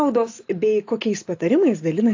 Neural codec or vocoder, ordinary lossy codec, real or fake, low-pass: none; AAC, 48 kbps; real; 7.2 kHz